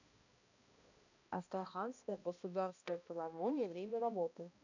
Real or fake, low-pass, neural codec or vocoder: fake; 7.2 kHz; codec, 16 kHz, 1 kbps, X-Codec, HuBERT features, trained on balanced general audio